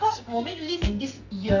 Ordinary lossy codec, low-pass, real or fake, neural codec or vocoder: none; 7.2 kHz; fake; codec, 44.1 kHz, 2.6 kbps, SNAC